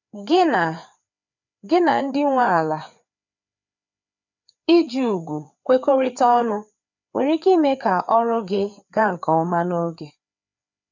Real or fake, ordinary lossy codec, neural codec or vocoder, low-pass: fake; none; codec, 16 kHz, 4 kbps, FreqCodec, larger model; 7.2 kHz